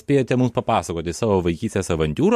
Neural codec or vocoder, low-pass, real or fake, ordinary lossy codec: none; 14.4 kHz; real; MP3, 64 kbps